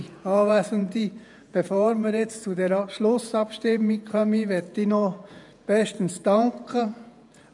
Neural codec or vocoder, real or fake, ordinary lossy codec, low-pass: vocoder, 48 kHz, 128 mel bands, Vocos; fake; none; 10.8 kHz